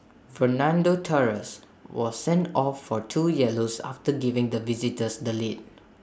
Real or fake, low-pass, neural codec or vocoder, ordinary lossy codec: real; none; none; none